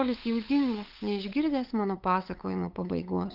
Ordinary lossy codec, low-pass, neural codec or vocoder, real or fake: Opus, 32 kbps; 5.4 kHz; codec, 16 kHz, 8 kbps, FunCodec, trained on LibriTTS, 25 frames a second; fake